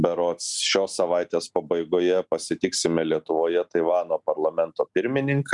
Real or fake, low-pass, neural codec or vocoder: real; 10.8 kHz; none